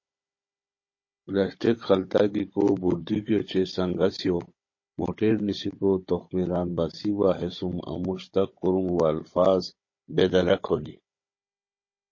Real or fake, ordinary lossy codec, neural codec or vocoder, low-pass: fake; MP3, 32 kbps; codec, 16 kHz, 16 kbps, FunCodec, trained on Chinese and English, 50 frames a second; 7.2 kHz